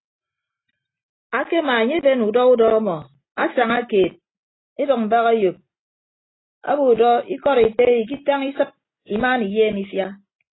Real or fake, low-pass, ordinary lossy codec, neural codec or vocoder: real; 7.2 kHz; AAC, 16 kbps; none